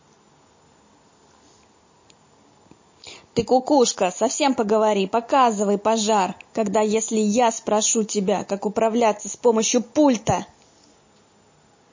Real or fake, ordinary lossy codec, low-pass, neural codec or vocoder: real; MP3, 32 kbps; 7.2 kHz; none